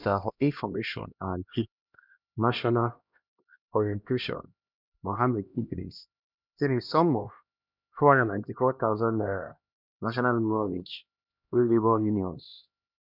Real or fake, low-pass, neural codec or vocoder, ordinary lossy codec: fake; 5.4 kHz; codec, 16 kHz, 1 kbps, X-Codec, HuBERT features, trained on LibriSpeech; none